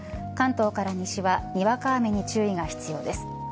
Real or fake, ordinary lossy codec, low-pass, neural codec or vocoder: real; none; none; none